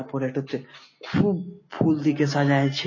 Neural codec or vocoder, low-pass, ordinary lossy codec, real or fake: none; 7.2 kHz; MP3, 32 kbps; real